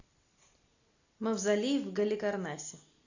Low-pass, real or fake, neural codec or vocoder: 7.2 kHz; real; none